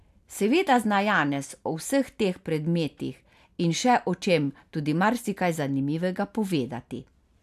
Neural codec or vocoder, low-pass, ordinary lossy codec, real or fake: none; 14.4 kHz; AAC, 96 kbps; real